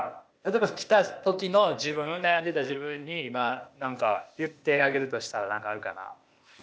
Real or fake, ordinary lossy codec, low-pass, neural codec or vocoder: fake; none; none; codec, 16 kHz, 0.8 kbps, ZipCodec